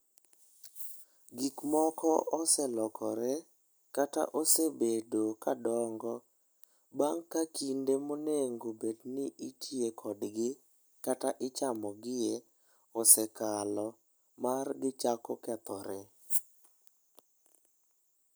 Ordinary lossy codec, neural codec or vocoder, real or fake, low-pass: none; none; real; none